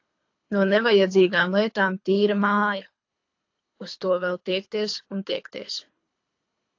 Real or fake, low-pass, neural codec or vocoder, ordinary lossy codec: fake; 7.2 kHz; codec, 24 kHz, 3 kbps, HILCodec; AAC, 48 kbps